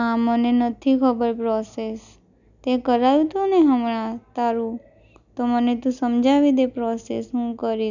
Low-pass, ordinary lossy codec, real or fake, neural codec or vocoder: 7.2 kHz; none; real; none